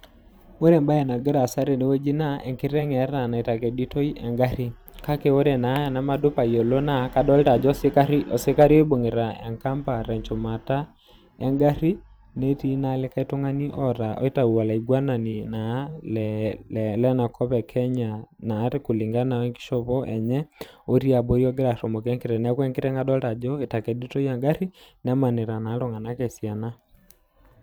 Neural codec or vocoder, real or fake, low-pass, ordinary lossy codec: vocoder, 44.1 kHz, 128 mel bands every 512 samples, BigVGAN v2; fake; none; none